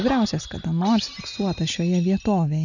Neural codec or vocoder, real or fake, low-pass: none; real; 7.2 kHz